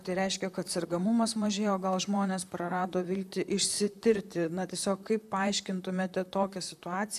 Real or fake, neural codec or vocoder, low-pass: fake; vocoder, 44.1 kHz, 128 mel bands, Pupu-Vocoder; 14.4 kHz